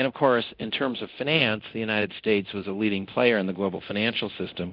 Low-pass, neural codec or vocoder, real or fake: 5.4 kHz; codec, 24 kHz, 0.9 kbps, DualCodec; fake